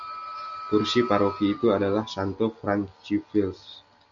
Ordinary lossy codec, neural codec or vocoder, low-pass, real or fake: MP3, 64 kbps; none; 7.2 kHz; real